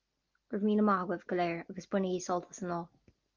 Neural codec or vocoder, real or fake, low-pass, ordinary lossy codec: none; real; 7.2 kHz; Opus, 32 kbps